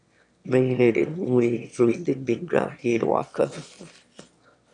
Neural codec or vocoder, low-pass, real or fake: autoencoder, 22.05 kHz, a latent of 192 numbers a frame, VITS, trained on one speaker; 9.9 kHz; fake